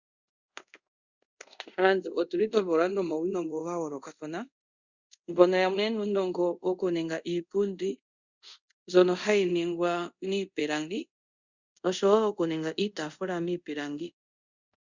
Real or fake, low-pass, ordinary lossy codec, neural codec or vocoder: fake; 7.2 kHz; Opus, 64 kbps; codec, 24 kHz, 0.5 kbps, DualCodec